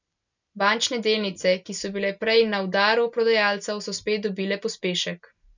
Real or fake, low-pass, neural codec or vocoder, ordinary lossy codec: real; 7.2 kHz; none; none